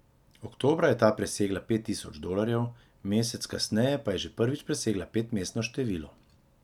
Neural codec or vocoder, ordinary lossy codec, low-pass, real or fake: none; none; 19.8 kHz; real